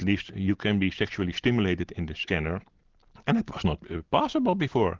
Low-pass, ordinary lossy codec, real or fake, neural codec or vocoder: 7.2 kHz; Opus, 16 kbps; real; none